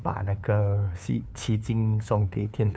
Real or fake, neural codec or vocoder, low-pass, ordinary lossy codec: fake; codec, 16 kHz, 2 kbps, FunCodec, trained on LibriTTS, 25 frames a second; none; none